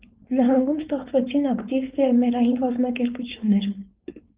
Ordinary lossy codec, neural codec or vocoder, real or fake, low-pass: Opus, 32 kbps; codec, 16 kHz, 4.8 kbps, FACodec; fake; 3.6 kHz